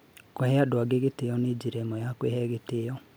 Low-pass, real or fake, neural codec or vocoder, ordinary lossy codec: none; real; none; none